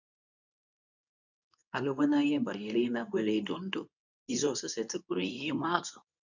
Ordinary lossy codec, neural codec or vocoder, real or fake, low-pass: none; codec, 24 kHz, 0.9 kbps, WavTokenizer, medium speech release version 2; fake; 7.2 kHz